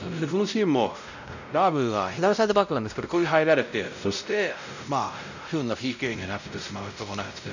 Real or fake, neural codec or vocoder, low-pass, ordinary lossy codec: fake; codec, 16 kHz, 0.5 kbps, X-Codec, WavLM features, trained on Multilingual LibriSpeech; 7.2 kHz; none